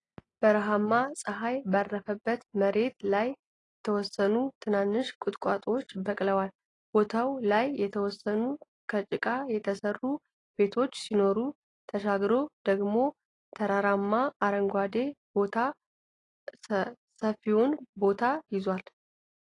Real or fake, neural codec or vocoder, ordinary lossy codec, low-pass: real; none; AAC, 32 kbps; 10.8 kHz